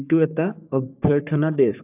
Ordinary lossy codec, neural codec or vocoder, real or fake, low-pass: AAC, 24 kbps; codec, 44.1 kHz, 3.4 kbps, Pupu-Codec; fake; 3.6 kHz